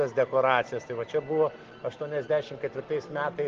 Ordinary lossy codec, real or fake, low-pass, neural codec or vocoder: Opus, 16 kbps; real; 7.2 kHz; none